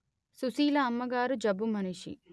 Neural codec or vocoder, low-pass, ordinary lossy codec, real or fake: none; none; none; real